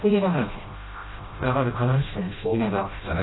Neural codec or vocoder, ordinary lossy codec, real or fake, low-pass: codec, 16 kHz, 0.5 kbps, FreqCodec, smaller model; AAC, 16 kbps; fake; 7.2 kHz